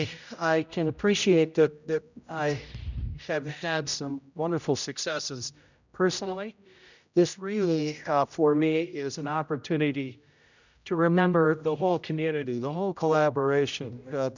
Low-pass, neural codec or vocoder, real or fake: 7.2 kHz; codec, 16 kHz, 0.5 kbps, X-Codec, HuBERT features, trained on general audio; fake